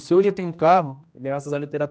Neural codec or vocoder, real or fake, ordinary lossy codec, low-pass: codec, 16 kHz, 1 kbps, X-Codec, HuBERT features, trained on general audio; fake; none; none